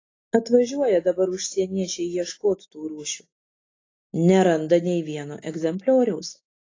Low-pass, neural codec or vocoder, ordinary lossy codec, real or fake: 7.2 kHz; none; AAC, 32 kbps; real